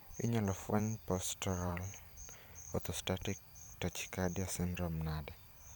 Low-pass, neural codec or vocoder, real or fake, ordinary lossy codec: none; vocoder, 44.1 kHz, 128 mel bands every 512 samples, BigVGAN v2; fake; none